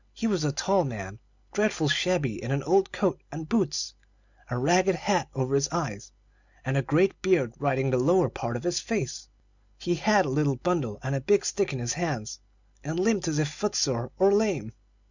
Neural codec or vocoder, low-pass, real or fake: none; 7.2 kHz; real